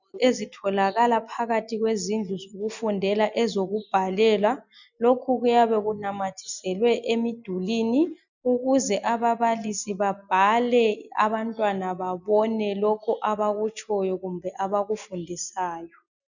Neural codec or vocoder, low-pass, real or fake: none; 7.2 kHz; real